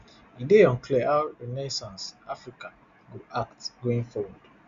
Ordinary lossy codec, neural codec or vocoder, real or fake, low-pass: Opus, 64 kbps; none; real; 7.2 kHz